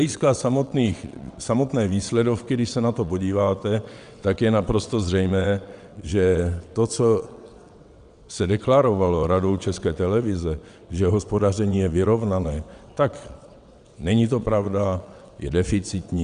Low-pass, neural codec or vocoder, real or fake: 9.9 kHz; vocoder, 22.05 kHz, 80 mel bands, WaveNeXt; fake